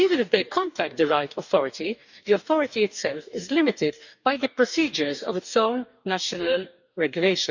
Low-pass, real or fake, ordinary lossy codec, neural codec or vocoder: 7.2 kHz; fake; none; codec, 44.1 kHz, 2.6 kbps, DAC